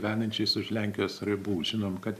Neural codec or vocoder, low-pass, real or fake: none; 14.4 kHz; real